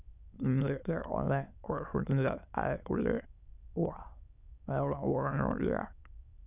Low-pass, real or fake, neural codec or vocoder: 3.6 kHz; fake; autoencoder, 22.05 kHz, a latent of 192 numbers a frame, VITS, trained on many speakers